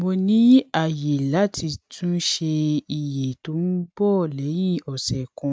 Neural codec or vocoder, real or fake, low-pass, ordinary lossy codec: none; real; none; none